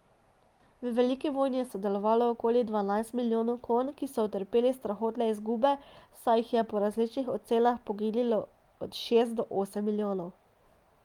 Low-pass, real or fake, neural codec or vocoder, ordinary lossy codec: 19.8 kHz; real; none; Opus, 32 kbps